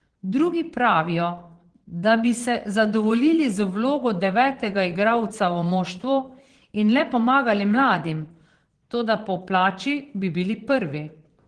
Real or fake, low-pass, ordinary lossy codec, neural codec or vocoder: fake; 9.9 kHz; Opus, 16 kbps; vocoder, 22.05 kHz, 80 mel bands, Vocos